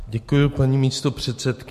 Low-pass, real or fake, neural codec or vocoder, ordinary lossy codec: 14.4 kHz; real; none; MP3, 64 kbps